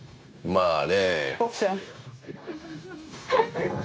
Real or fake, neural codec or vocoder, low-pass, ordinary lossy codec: fake; codec, 16 kHz, 0.9 kbps, LongCat-Audio-Codec; none; none